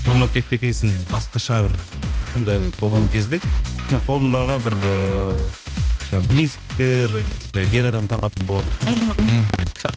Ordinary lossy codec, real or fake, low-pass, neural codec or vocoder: none; fake; none; codec, 16 kHz, 1 kbps, X-Codec, HuBERT features, trained on balanced general audio